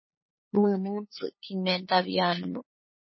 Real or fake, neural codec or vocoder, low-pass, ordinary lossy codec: fake; codec, 16 kHz, 8 kbps, FunCodec, trained on LibriTTS, 25 frames a second; 7.2 kHz; MP3, 24 kbps